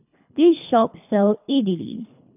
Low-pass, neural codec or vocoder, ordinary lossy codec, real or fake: 3.6 kHz; codec, 24 kHz, 3 kbps, HILCodec; none; fake